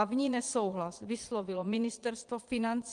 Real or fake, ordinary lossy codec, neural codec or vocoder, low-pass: fake; Opus, 24 kbps; vocoder, 22.05 kHz, 80 mel bands, Vocos; 9.9 kHz